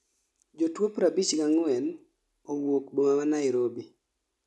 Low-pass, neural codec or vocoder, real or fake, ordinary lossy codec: none; none; real; none